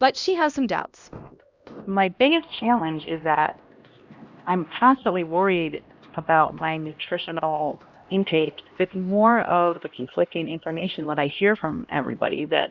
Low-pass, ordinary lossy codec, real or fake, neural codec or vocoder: 7.2 kHz; Opus, 64 kbps; fake; codec, 16 kHz, 1 kbps, X-Codec, HuBERT features, trained on LibriSpeech